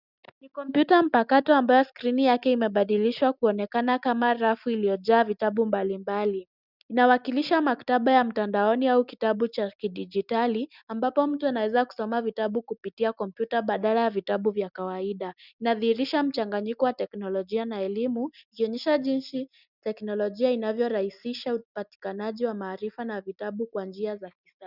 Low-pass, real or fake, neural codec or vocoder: 5.4 kHz; real; none